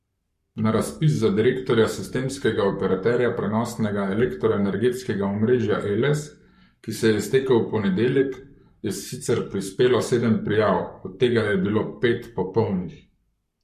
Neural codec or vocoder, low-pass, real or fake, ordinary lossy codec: codec, 44.1 kHz, 7.8 kbps, Pupu-Codec; 14.4 kHz; fake; MP3, 64 kbps